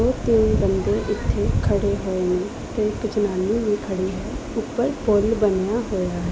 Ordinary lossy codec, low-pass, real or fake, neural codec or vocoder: none; none; real; none